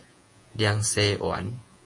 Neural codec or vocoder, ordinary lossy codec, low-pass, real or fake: vocoder, 48 kHz, 128 mel bands, Vocos; MP3, 48 kbps; 10.8 kHz; fake